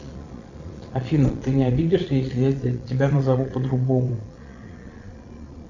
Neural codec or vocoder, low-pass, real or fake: vocoder, 22.05 kHz, 80 mel bands, Vocos; 7.2 kHz; fake